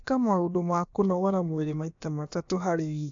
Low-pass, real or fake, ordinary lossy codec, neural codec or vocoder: 7.2 kHz; fake; AAC, 64 kbps; codec, 16 kHz, about 1 kbps, DyCAST, with the encoder's durations